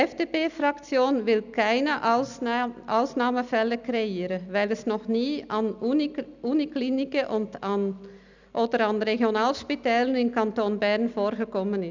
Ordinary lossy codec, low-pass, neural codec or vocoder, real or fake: none; 7.2 kHz; none; real